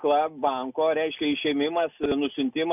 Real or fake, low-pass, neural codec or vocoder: real; 3.6 kHz; none